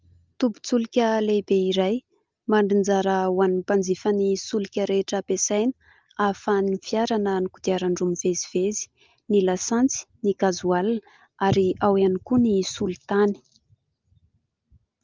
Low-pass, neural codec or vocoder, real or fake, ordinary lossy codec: 7.2 kHz; none; real; Opus, 24 kbps